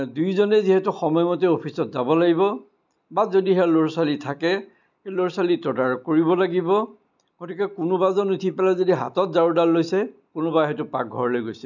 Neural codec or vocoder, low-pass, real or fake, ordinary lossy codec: none; 7.2 kHz; real; none